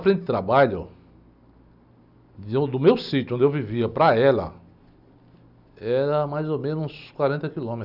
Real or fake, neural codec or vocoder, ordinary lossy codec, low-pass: real; none; none; 5.4 kHz